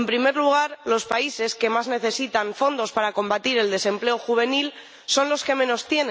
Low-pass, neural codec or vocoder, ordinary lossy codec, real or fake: none; none; none; real